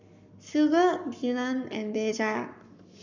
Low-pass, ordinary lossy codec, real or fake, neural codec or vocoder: 7.2 kHz; none; fake; codec, 44.1 kHz, 7.8 kbps, Pupu-Codec